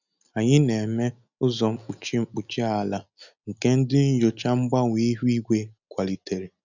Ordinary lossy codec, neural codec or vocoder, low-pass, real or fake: none; none; 7.2 kHz; real